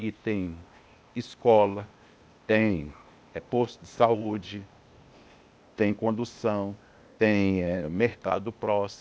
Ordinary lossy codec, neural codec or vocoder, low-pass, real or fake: none; codec, 16 kHz, 0.8 kbps, ZipCodec; none; fake